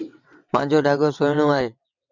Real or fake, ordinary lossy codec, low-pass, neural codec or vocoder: fake; MP3, 64 kbps; 7.2 kHz; vocoder, 22.05 kHz, 80 mel bands, WaveNeXt